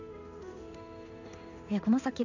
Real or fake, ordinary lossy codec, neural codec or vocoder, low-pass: fake; none; codec, 16 kHz in and 24 kHz out, 1 kbps, XY-Tokenizer; 7.2 kHz